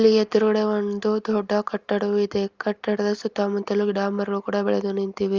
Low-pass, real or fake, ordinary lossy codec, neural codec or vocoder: 7.2 kHz; real; Opus, 32 kbps; none